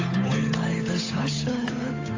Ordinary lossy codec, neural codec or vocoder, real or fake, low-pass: AAC, 32 kbps; codec, 16 kHz, 8 kbps, FunCodec, trained on Chinese and English, 25 frames a second; fake; 7.2 kHz